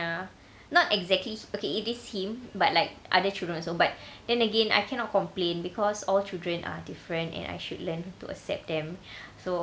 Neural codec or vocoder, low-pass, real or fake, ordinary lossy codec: none; none; real; none